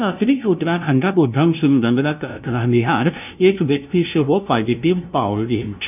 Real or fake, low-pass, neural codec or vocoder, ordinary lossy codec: fake; 3.6 kHz; codec, 16 kHz, 0.5 kbps, FunCodec, trained on LibriTTS, 25 frames a second; none